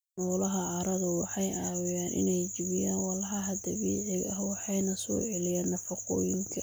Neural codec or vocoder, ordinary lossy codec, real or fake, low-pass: none; none; real; none